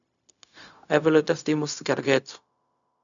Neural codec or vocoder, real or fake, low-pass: codec, 16 kHz, 0.4 kbps, LongCat-Audio-Codec; fake; 7.2 kHz